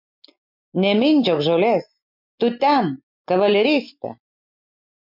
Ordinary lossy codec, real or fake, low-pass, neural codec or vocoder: MP3, 48 kbps; real; 5.4 kHz; none